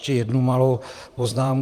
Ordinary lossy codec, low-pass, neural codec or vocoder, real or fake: Opus, 32 kbps; 14.4 kHz; vocoder, 44.1 kHz, 128 mel bands, Pupu-Vocoder; fake